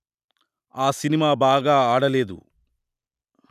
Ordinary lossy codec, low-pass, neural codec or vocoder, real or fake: none; 14.4 kHz; none; real